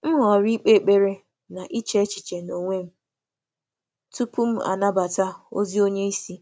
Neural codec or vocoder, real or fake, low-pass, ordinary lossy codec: none; real; none; none